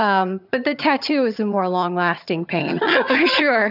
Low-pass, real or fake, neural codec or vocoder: 5.4 kHz; fake; vocoder, 22.05 kHz, 80 mel bands, HiFi-GAN